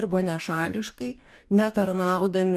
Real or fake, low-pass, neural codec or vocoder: fake; 14.4 kHz; codec, 44.1 kHz, 2.6 kbps, DAC